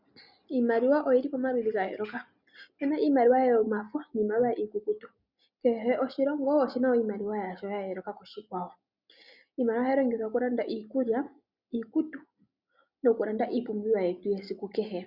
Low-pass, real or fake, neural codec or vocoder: 5.4 kHz; real; none